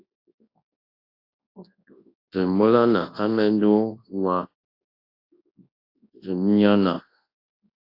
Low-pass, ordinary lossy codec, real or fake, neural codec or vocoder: 5.4 kHz; AAC, 32 kbps; fake; codec, 24 kHz, 0.9 kbps, WavTokenizer, large speech release